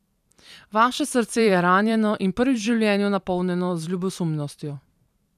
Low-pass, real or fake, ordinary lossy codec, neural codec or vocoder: 14.4 kHz; fake; none; vocoder, 44.1 kHz, 128 mel bands every 512 samples, BigVGAN v2